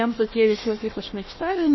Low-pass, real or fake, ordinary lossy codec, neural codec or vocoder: 7.2 kHz; fake; MP3, 24 kbps; codec, 16 kHz, 1 kbps, FunCodec, trained on Chinese and English, 50 frames a second